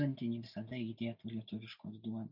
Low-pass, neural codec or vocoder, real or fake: 5.4 kHz; none; real